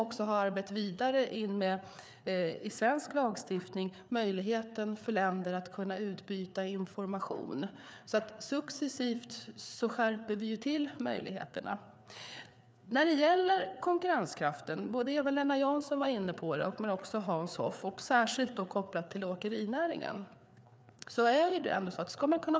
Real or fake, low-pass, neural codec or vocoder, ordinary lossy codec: fake; none; codec, 16 kHz, 4 kbps, FreqCodec, larger model; none